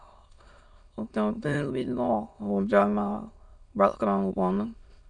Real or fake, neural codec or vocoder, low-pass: fake; autoencoder, 22.05 kHz, a latent of 192 numbers a frame, VITS, trained on many speakers; 9.9 kHz